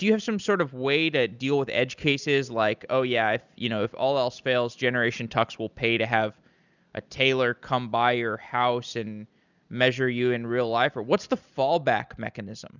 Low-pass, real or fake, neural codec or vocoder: 7.2 kHz; real; none